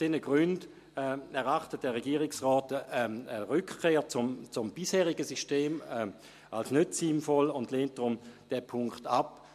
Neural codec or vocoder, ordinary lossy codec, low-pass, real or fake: none; MP3, 64 kbps; 14.4 kHz; real